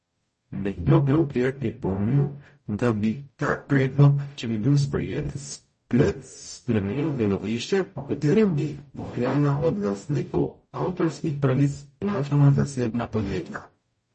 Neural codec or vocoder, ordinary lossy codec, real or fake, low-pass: codec, 44.1 kHz, 0.9 kbps, DAC; MP3, 32 kbps; fake; 10.8 kHz